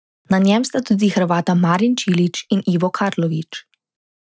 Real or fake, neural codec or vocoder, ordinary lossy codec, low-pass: real; none; none; none